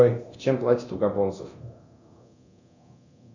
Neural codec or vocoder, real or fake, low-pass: codec, 24 kHz, 0.9 kbps, DualCodec; fake; 7.2 kHz